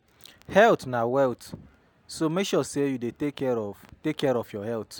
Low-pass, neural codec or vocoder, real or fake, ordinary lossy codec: 19.8 kHz; none; real; none